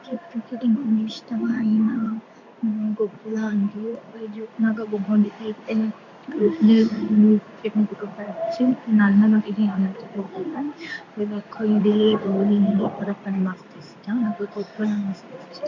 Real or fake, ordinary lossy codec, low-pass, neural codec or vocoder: fake; MP3, 64 kbps; 7.2 kHz; codec, 16 kHz in and 24 kHz out, 1 kbps, XY-Tokenizer